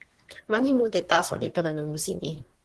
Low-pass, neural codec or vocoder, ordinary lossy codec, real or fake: 10.8 kHz; codec, 24 kHz, 1 kbps, SNAC; Opus, 16 kbps; fake